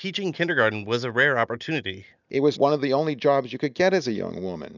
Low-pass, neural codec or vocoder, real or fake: 7.2 kHz; none; real